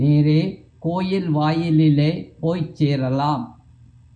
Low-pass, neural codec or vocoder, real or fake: 9.9 kHz; none; real